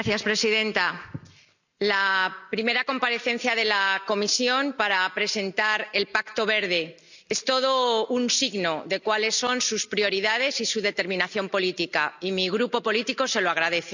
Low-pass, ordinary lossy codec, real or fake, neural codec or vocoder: 7.2 kHz; none; real; none